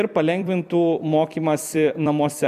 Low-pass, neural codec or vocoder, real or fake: 14.4 kHz; vocoder, 44.1 kHz, 128 mel bands every 256 samples, BigVGAN v2; fake